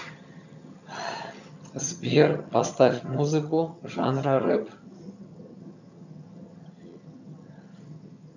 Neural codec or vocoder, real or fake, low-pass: vocoder, 22.05 kHz, 80 mel bands, HiFi-GAN; fake; 7.2 kHz